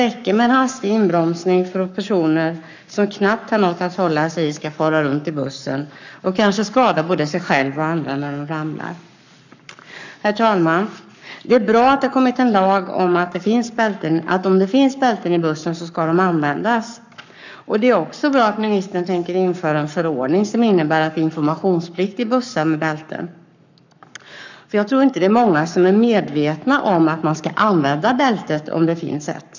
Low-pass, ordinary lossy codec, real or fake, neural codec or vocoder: 7.2 kHz; none; fake; codec, 44.1 kHz, 7.8 kbps, Pupu-Codec